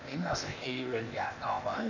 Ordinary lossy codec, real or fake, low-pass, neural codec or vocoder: none; fake; 7.2 kHz; codec, 16 kHz, 0.8 kbps, ZipCodec